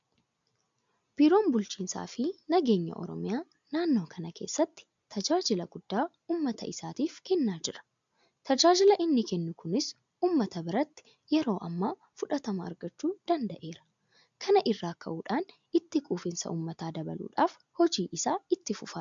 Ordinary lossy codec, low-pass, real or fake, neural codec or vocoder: MP3, 64 kbps; 7.2 kHz; real; none